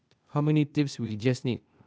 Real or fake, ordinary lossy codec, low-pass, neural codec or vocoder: fake; none; none; codec, 16 kHz, 0.8 kbps, ZipCodec